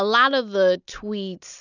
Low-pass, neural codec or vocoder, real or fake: 7.2 kHz; none; real